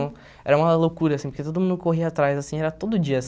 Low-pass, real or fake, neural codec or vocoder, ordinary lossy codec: none; real; none; none